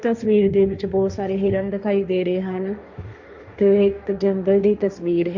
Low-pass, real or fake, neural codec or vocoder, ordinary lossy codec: 7.2 kHz; fake; codec, 16 kHz, 1.1 kbps, Voila-Tokenizer; Opus, 64 kbps